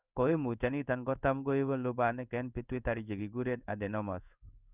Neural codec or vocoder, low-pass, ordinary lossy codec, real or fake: codec, 16 kHz in and 24 kHz out, 1 kbps, XY-Tokenizer; 3.6 kHz; none; fake